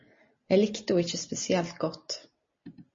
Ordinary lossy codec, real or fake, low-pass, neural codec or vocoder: MP3, 32 kbps; real; 7.2 kHz; none